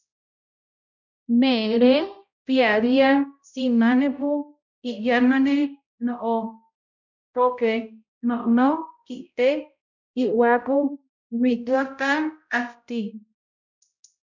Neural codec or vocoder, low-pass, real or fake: codec, 16 kHz, 0.5 kbps, X-Codec, HuBERT features, trained on balanced general audio; 7.2 kHz; fake